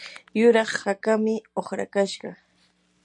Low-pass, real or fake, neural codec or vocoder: 10.8 kHz; real; none